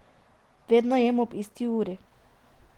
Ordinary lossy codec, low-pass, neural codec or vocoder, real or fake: Opus, 16 kbps; 19.8 kHz; none; real